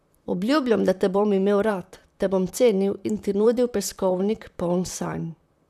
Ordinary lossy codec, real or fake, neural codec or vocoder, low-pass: none; fake; vocoder, 44.1 kHz, 128 mel bands, Pupu-Vocoder; 14.4 kHz